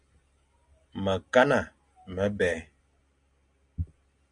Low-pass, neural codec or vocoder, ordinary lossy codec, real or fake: 9.9 kHz; none; MP3, 64 kbps; real